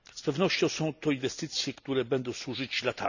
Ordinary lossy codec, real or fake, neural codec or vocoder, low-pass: none; real; none; 7.2 kHz